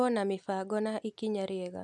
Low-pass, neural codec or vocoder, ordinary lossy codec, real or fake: none; none; none; real